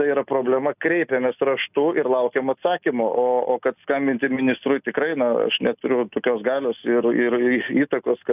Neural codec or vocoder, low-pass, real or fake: none; 3.6 kHz; real